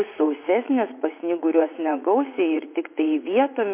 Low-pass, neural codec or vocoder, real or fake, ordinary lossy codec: 3.6 kHz; vocoder, 44.1 kHz, 80 mel bands, Vocos; fake; MP3, 24 kbps